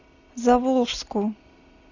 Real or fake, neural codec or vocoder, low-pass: real; none; 7.2 kHz